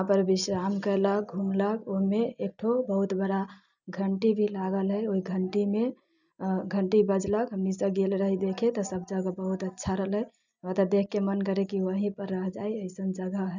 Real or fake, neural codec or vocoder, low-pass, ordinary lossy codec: real; none; 7.2 kHz; none